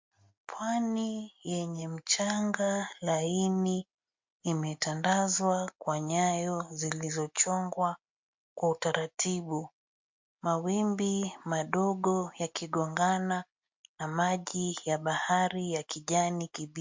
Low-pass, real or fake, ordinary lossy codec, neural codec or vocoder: 7.2 kHz; real; MP3, 48 kbps; none